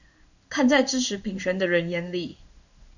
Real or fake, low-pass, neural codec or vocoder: fake; 7.2 kHz; codec, 16 kHz in and 24 kHz out, 1 kbps, XY-Tokenizer